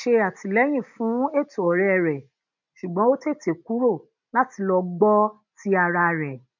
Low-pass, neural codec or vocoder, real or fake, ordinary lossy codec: 7.2 kHz; none; real; none